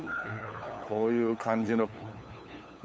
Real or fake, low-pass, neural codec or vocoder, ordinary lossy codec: fake; none; codec, 16 kHz, 8 kbps, FunCodec, trained on LibriTTS, 25 frames a second; none